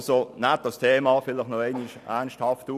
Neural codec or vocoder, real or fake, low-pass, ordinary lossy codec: none; real; 14.4 kHz; none